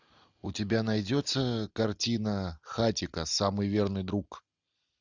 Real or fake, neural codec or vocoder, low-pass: real; none; 7.2 kHz